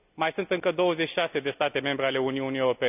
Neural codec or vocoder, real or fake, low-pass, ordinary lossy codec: none; real; 3.6 kHz; none